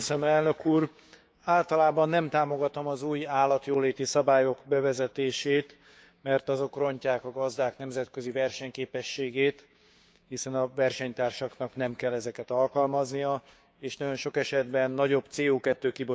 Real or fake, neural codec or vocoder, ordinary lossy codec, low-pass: fake; codec, 16 kHz, 6 kbps, DAC; none; none